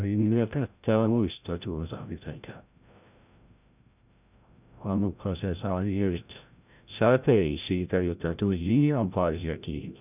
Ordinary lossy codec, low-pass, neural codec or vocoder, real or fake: none; 3.6 kHz; codec, 16 kHz, 0.5 kbps, FreqCodec, larger model; fake